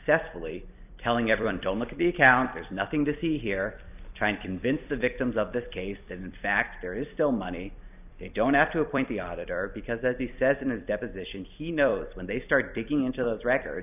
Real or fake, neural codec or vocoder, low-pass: fake; vocoder, 44.1 kHz, 128 mel bands every 256 samples, BigVGAN v2; 3.6 kHz